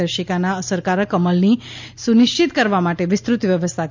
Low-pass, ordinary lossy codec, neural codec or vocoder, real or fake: 7.2 kHz; MP3, 64 kbps; none; real